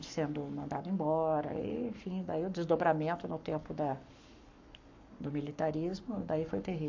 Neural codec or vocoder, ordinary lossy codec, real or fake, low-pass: codec, 44.1 kHz, 7.8 kbps, Pupu-Codec; AAC, 48 kbps; fake; 7.2 kHz